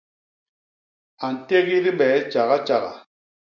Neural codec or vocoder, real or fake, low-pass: none; real; 7.2 kHz